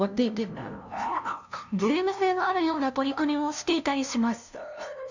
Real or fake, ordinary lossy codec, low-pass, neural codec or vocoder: fake; none; 7.2 kHz; codec, 16 kHz, 0.5 kbps, FunCodec, trained on LibriTTS, 25 frames a second